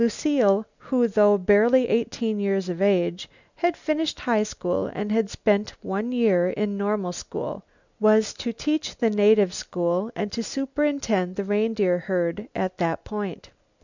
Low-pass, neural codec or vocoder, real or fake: 7.2 kHz; none; real